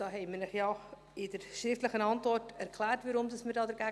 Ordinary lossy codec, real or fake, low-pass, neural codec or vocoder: none; real; none; none